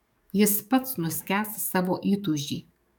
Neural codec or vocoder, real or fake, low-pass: codec, 44.1 kHz, 7.8 kbps, DAC; fake; 19.8 kHz